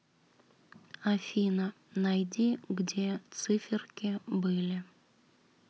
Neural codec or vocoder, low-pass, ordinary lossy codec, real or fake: none; none; none; real